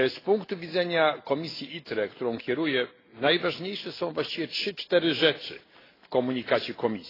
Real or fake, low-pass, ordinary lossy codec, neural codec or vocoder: real; 5.4 kHz; AAC, 24 kbps; none